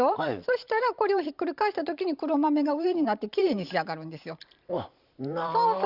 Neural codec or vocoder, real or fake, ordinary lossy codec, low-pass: vocoder, 44.1 kHz, 128 mel bands every 512 samples, BigVGAN v2; fake; Opus, 64 kbps; 5.4 kHz